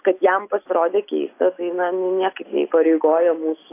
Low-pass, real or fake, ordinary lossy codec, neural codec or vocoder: 3.6 kHz; real; AAC, 24 kbps; none